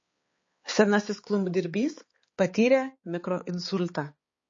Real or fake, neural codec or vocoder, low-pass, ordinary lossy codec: fake; codec, 16 kHz, 4 kbps, X-Codec, HuBERT features, trained on balanced general audio; 7.2 kHz; MP3, 32 kbps